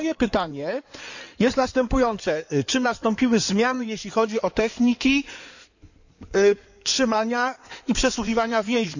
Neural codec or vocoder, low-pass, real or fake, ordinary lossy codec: codec, 16 kHz in and 24 kHz out, 2.2 kbps, FireRedTTS-2 codec; 7.2 kHz; fake; none